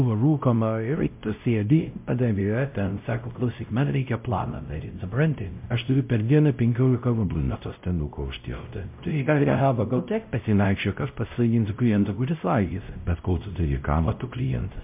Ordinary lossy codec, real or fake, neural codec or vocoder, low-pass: MP3, 32 kbps; fake; codec, 16 kHz, 0.5 kbps, X-Codec, WavLM features, trained on Multilingual LibriSpeech; 3.6 kHz